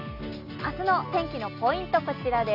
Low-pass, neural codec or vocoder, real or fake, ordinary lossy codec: 5.4 kHz; none; real; none